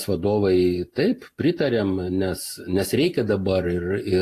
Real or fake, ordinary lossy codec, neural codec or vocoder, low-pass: real; AAC, 48 kbps; none; 14.4 kHz